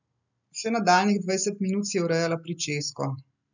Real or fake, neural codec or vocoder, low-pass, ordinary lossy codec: real; none; 7.2 kHz; none